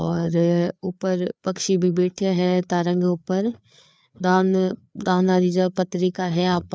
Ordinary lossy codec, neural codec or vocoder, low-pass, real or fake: none; codec, 16 kHz, 4 kbps, FreqCodec, larger model; none; fake